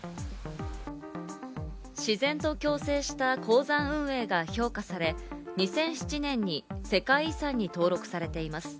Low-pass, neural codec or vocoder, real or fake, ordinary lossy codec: none; none; real; none